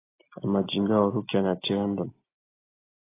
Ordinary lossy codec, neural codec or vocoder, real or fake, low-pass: AAC, 16 kbps; none; real; 3.6 kHz